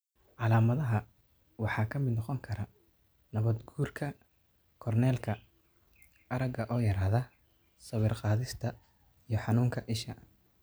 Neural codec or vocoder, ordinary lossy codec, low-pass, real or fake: none; none; none; real